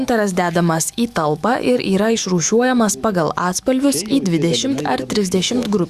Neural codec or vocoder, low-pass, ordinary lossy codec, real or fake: autoencoder, 48 kHz, 128 numbers a frame, DAC-VAE, trained on Japanese speech; 14.4 kHz; Opus, 64 kbps; fake